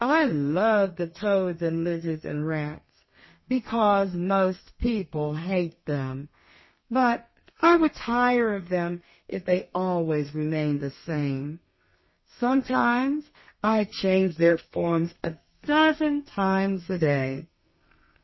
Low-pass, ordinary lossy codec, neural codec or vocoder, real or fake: 7.2 kHz; MP3, 24 kbps; codec, 32 kHz, 1.9 kbps, SNAC; fake